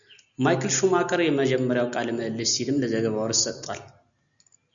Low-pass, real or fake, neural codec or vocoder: 7.2 kHz; real; none